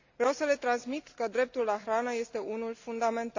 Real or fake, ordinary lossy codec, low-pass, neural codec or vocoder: real; AAC, 48 kbps; 7.2 kHz; none